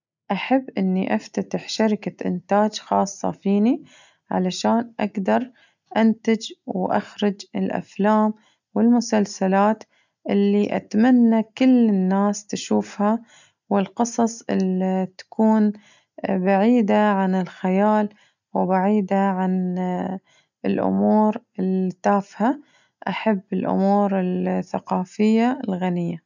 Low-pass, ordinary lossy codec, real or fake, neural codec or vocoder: 7.2 kHz; none; real; none